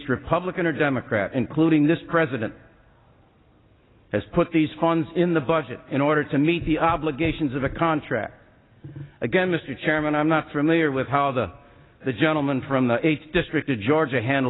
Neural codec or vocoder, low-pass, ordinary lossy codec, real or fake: none; 7.2 kHz; AAC, 16 kbps; real